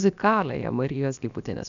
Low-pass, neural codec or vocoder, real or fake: 7.2 kHz; codec, 16 kHz, about 1 kbps, DyCAST, with the encoder's durations; fake